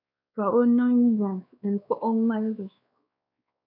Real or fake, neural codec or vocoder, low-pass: fake; codec, 16 kHz, 2 kbps, X-Codec, WavLM features, trained on Multilingual LibriSpeech; 5.4 kHz